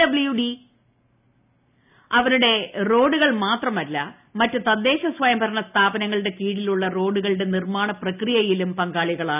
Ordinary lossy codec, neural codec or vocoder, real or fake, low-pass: none; none; real; 3.6 kHz